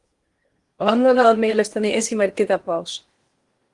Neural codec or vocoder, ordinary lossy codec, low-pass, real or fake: codec, 16 kHz in and 24 kHz out, 0.8 kbps, FocalCodec, streaming, 65536 codes; Opus, 24 kbps; 10.8 kHz; fake